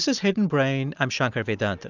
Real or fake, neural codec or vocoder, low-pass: real; none; 7.2 kHz